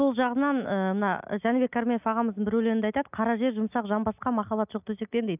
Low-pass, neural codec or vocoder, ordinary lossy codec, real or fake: 3.6 kHz; none; none; real